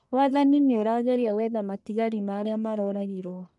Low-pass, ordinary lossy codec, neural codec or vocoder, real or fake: 10.8 kHz; none; codec, 44.1 kHz, 1.7 kbps, Pupu-Codec; fake